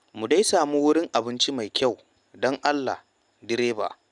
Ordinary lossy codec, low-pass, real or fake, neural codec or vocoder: none; 10.8 kHz; real; none